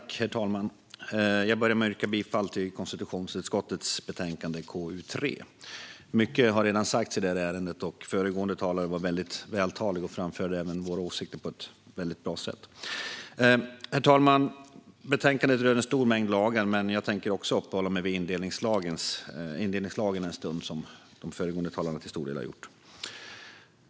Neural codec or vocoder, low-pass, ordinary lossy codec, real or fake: none; none; none; real